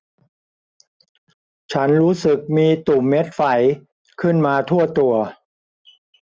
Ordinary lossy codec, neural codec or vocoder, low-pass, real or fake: none; none; none; real